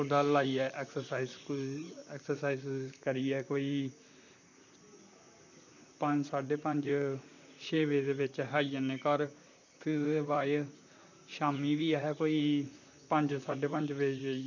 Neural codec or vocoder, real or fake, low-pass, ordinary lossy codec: vocoder, 44.1 kHz, 128 mel bands, Pupu-Vocoder; fake; 7.2 kHz; none